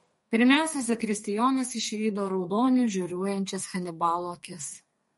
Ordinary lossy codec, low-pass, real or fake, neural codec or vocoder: MP3, 48 kbps; 14.4 kHz; fake; codec, 32 kHz, 1.9 kbps, SNAC